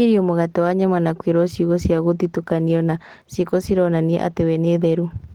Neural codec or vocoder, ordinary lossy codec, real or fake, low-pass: none; Opus, 16 kbps; real; 19.8 kHz